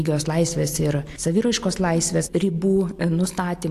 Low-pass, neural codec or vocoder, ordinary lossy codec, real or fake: 14.4 kHz; none; AAC, 64 kbps; real